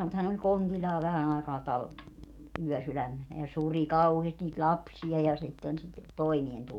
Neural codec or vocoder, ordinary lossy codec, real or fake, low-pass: codec, 44.1 kHz, 7.8 kbps, DAC; none; fake; 19.8 kHz